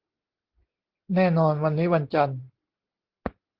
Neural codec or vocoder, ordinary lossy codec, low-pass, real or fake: none; Opus, 16 kbps; 5.4 kHz; real